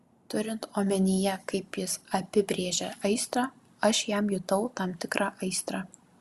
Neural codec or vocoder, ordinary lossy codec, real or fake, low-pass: none; Opus, 64 kbps; real; 14.4 kHz